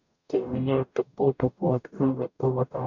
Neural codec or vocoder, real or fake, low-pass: codec, 44.1 kHz, 0.9 kbps, DAC; fake; 7.2 kHz